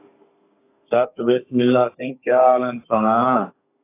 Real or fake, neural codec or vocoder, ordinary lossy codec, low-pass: fake; codec, 32 kHz, 1.9 kbps, SNAC; AAC, 24 kbps; 3.6 kHz